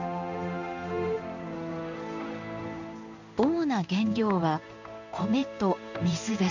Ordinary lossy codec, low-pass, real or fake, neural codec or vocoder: none; 7.2 kHz; fake; codec, 16 kHz in and 24 kHz out, 1 kbps, XY-Tokenizer